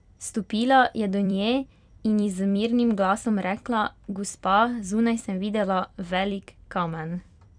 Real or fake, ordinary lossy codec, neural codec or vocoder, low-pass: fake; none; vocoder, 44.1 kHz, 128 mel bands every 256 samples, BigVGAN v2; 9.9 kHz